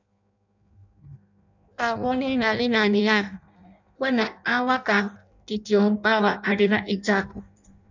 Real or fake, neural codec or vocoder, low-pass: fake; codec, 16 kHz in and 24 kHz out, 0.6 kbps, FireRedTTS-2 codec; 7.2 kHz